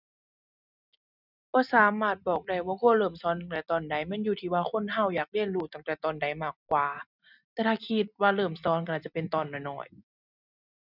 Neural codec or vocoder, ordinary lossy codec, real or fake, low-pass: none; none; real; 5.4 kHz